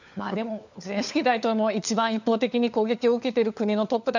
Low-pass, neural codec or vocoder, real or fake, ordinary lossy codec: 7.2 kHz; codec, 16 kHz, 4 kbps, FunCodec, trained on LibriTTS, 50 frames a second; fake; none